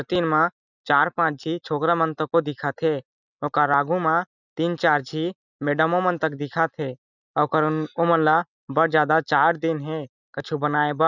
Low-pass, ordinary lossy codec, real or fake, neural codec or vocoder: 7.2 kHz; none; real; none